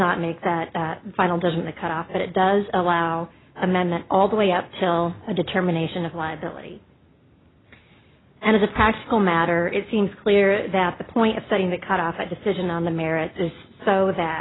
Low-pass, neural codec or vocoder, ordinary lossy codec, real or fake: 7.2 kHz; none; AAC, 16 kbps; real